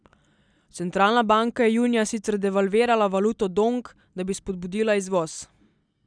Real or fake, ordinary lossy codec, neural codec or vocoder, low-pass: real; none; none; 9.9 kHz